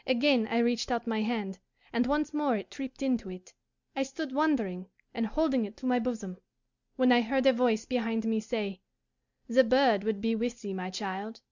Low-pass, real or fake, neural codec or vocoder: 7.2 kHz; real; none